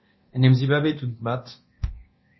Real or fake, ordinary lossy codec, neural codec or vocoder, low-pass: fake; MP3, 24 kbps; codec, 24 kHz, 0.9 kbps, DualCodec; 7.2 kHz